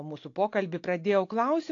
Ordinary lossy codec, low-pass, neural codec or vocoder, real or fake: AAC, 48 kbps; 7.2 kHz; none; real